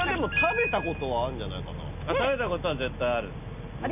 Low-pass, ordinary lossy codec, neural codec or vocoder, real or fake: 3.6 kHz; none; none; real